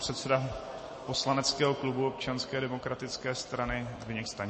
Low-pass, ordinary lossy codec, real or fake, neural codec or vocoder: 10.8 kHz; MP3, 32 kbps; real; none